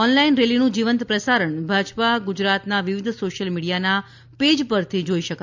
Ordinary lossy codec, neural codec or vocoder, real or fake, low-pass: MP3, 64 kbps; none; real; 7.2 kHz